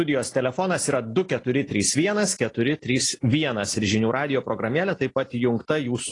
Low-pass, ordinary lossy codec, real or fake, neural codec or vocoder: 10.8 kHz; AAC, 32 kbps; real; none